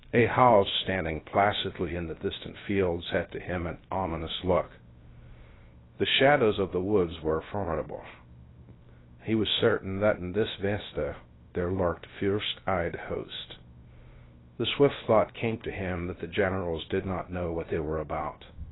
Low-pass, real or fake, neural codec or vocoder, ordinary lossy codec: 7.2 kHz; fake; codec, 16 kHz, 0.3 kbps, FocalCodec; AAC, 16 kbps